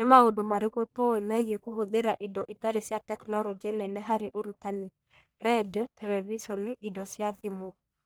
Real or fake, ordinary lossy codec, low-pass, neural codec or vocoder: fake; none; none; codec, 44.1 kHz, 1.7 kbps, Pupu-Codec